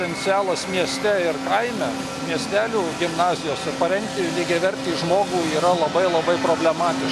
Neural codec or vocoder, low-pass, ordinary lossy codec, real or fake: none; 14.4 kHz; MP3, 96 kbps; real